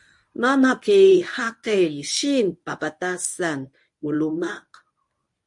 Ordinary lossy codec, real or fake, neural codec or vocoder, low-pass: MP3, 48 kbps; fake; codec, 24 kHz, 0.9 kbps, WavTokenizer, medium speech release version 1; 10.8 kHz